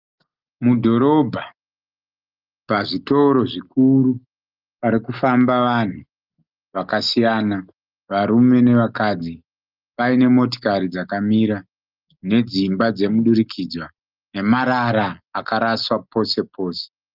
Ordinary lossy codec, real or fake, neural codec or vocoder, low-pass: Opus, 24 kbps; real; none; 5.4 kHz